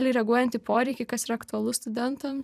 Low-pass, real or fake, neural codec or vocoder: 14.4 kHz; fake; vocoder, 44.1 kHz, 128 mel bands every 256 samples, BigVGAN v2